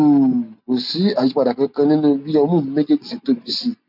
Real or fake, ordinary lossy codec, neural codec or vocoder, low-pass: real; none; none; 5.4 kHz